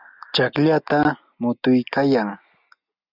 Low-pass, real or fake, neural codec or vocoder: 5.4 kHz; real; none